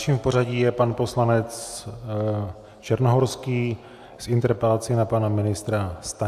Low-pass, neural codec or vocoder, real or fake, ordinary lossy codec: 14.4 kHz; none; real; AAC, 96 kbps